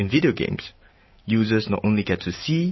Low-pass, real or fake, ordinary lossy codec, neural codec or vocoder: 7.2 kHz; real; MP3, 24 kbps; none